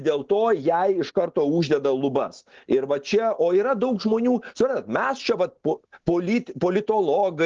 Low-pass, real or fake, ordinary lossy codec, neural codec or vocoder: 7.2 kHz; real; Opus, 16 kbps; none